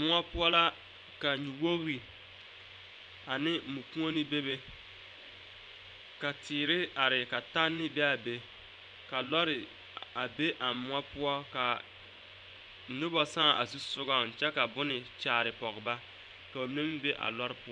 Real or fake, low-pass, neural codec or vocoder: fake; 9.9 kHz; vocoder, 24 kHz, 100 mel bands, Vocos